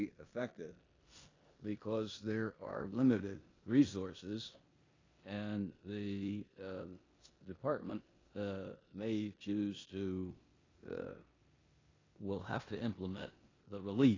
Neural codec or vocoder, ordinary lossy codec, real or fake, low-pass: codec, 16 kHz in and 24 kHz out, 0.9 kbps, LongCat-Audio-Codec, four codebook decoder; AAC, 32 kbps; fake; 7.2 kHz